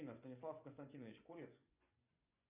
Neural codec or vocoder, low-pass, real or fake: vocoder, 24 kHz, 100 mel bands, Vocos; 3.6 kHz; fake